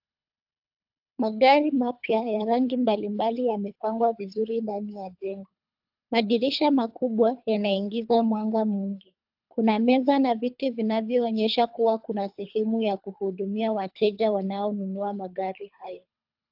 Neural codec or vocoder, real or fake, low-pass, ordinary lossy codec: codec, 24 kHz, 3 kbps, HILCodec; fake; 5.4 kHz; AAC, 48 kbps